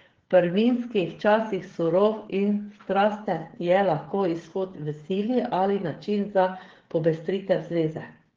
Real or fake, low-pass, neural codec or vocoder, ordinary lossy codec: fake; 7.2 kHz; codec, 16 kHz, 8 kbps, FreqCodec, smaller model; Opus, 16 kbps